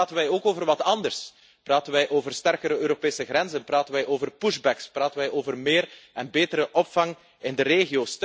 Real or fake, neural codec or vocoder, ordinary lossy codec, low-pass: real; none; none; none